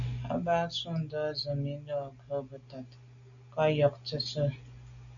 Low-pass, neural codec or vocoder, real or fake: 7.2 kHz; none; real